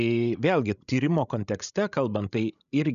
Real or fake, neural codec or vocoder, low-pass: fake; codec, 16 kHz, 16 kbps, FreqCodec, larger model; 7.2 kHz